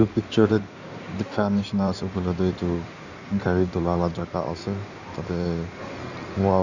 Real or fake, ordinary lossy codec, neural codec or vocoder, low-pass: fake; none; codec, 16 kHz in and 24 kHz out, 2.2 kbps, FireRedTTS-2 codec; 7.2 kHz